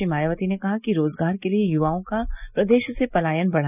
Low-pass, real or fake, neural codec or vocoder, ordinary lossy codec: 3.6 kHz; real; none; none